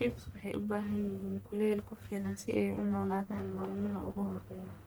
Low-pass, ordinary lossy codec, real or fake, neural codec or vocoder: none; none; fake; codec, 44.1 kHz, 1.7 kbps, Pupu-Codec